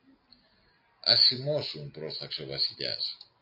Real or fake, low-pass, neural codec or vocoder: real; 5.4 kHz; none